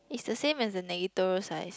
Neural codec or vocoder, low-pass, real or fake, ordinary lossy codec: none; none; real; none